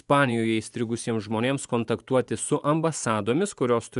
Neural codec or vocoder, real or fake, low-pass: vocoder, 24 kHz, 100 mel bands, Vocos; fake; 10.8 kHz